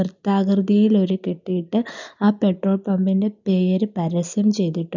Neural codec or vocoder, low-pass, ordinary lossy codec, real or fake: none; 7.2 kHz; none; real